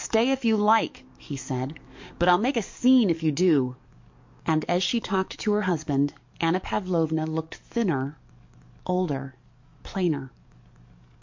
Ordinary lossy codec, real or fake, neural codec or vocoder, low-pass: MP3, 48 kbps; fake; codec, 44.1 kHz, 7.8 kbps, DAC; 7.2 kHz